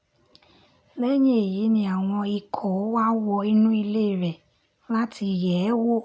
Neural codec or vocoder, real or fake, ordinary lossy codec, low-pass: none; real; none; none